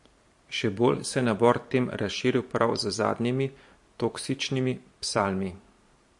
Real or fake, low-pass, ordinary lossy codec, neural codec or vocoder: fake; 10.8 kHz; MP3, 48 kbps; vocoder, 44.1 kHz, 128 mel bands every 512 samples, BigVGAN v2